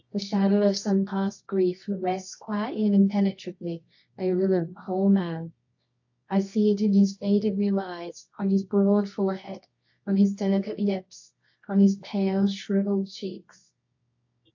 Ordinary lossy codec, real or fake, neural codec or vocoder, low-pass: AAC, 48 kbps; fake; codec, 24 kHz, 0.9 kbps, WavTokenizer, medium music audio release; 7.2 kHz